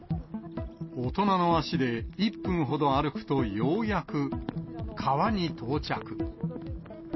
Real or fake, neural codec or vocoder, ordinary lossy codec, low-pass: real; none; MP3, 24 kbps; 7.2 kHz